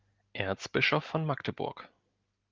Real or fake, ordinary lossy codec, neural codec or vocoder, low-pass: real; Opus, 32 kbps; none; 7.2 kHz